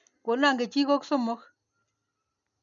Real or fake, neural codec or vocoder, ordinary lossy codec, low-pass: real; none; none; 7.2 kHz